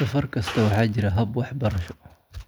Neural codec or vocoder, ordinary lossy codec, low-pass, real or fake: none; none; none; real